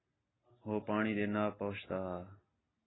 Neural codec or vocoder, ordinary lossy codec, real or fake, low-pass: none; AAC, 16 kbps; real; 7.2 kHz